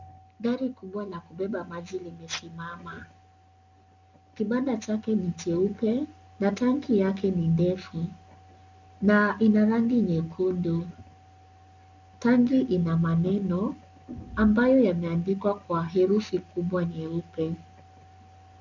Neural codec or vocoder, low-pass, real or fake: none; 7.2 kHz; real